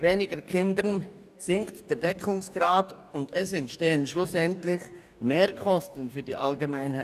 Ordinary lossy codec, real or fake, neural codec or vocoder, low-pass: none; fake; codec, 44.1 kHz, 2.6 kbps, DAC; 14.4 kHz